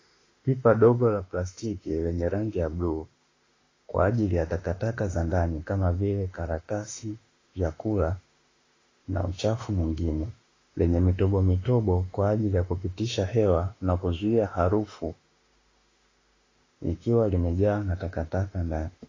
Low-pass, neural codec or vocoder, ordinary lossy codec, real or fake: 7.2 kHz; autoencoder, 48 kHz, 32 numbers a frame, DAC-VAE, trained on Japanese speech; AAC, 32 kbps; fake